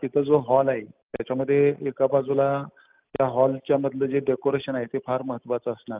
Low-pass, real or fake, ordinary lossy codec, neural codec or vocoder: 3.6 kHz; real; Opus, 32 kbps; none